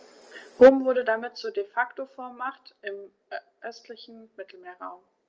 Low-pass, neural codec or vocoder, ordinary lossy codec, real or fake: 7.2 kHz; none; Opus, 24 kbps; real